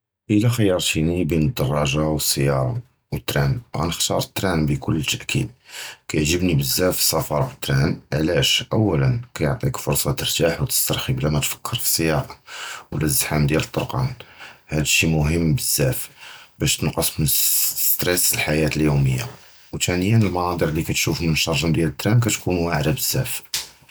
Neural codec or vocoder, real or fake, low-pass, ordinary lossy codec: none; real; none; none